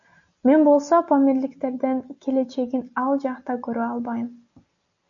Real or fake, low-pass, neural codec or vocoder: real; 7.2 kHz; none